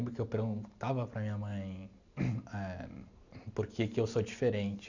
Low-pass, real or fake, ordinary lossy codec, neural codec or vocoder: 7.2 kHz; real; none; none